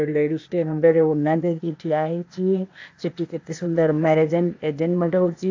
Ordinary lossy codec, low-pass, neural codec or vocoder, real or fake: AAC, 48 kbps; 7.2 kHz; codec, 16 kHz, 0.8 kbps, ZipCodec; fake